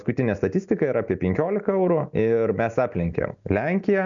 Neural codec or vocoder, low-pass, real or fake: none; 7.2 kHz; real